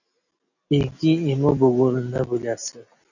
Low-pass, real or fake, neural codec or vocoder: 7.2 kHz; real; none